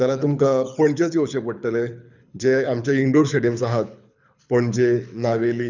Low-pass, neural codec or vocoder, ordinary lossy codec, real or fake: 7.2 kHz; codec, 24 kHz, 6 kbps, HILCodec; none; fake